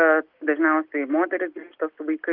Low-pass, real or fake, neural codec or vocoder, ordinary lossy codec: 5.4 kHz; real; none; Opus, 32 kbps